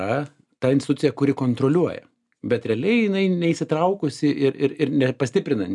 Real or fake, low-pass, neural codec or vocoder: real; 10.8 kHz; none